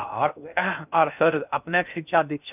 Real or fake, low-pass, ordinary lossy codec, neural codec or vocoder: fake; 3.6 kHz; none; codec, 16 kHz in and 24 kHz out, 0.6 kbps, FocalCodec, streaming, 2048 codes